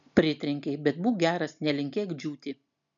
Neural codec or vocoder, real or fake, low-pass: none; real; 7.2 kHz